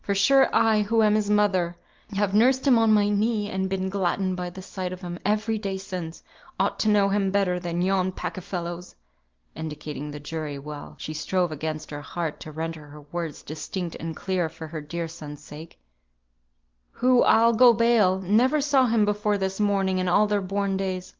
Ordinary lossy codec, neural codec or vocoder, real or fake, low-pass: Opus, 32 kbps; none; real; 7.2 kHz